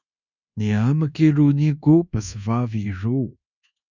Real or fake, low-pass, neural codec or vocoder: fake; 7.2 kHz; codec, 24 kHz, 1.2 kbps, DualCodec